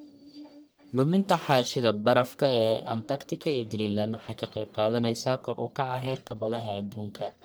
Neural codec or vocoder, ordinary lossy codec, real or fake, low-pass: codec, 44.1 kHz, 1.7 kbps, Pupu-Codec; none; fake; none